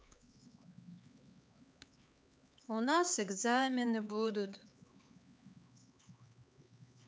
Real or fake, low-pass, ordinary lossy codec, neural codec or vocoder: fake; none; none; codec, 16 kHz, 4 kbps, X-Codec, HuBERT features, trained on LibriSpeech